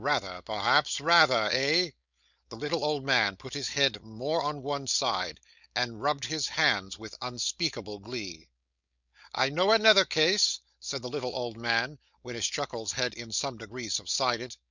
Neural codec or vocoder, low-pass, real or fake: codec, 16 kHz, 4.8 kbps, FACodec; 7.2 kHz; fake